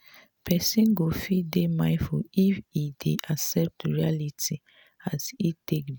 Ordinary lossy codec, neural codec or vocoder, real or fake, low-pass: none; none; real; none